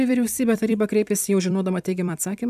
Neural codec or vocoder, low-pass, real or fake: vocoder, 44.1 kHz, 128 mel bands every 256 samples, BigVGAN v2; 14.4 kHz; fake